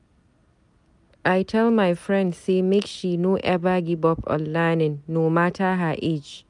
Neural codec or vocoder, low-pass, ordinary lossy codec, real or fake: none; 10.8 kHz; none; real